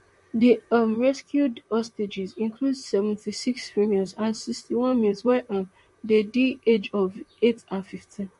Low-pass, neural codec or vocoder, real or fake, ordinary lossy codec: 14.4 kHz; vocoder, 44.1 kHz, 128 mel bands, Pupu-Vocoder; fake; MP3, 48 kbps